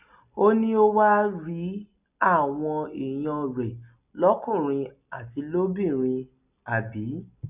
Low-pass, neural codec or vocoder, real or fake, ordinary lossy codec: 3.6 kHz; none; real; none